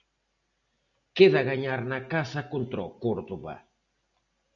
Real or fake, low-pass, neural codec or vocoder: real; 7.2 kHz; none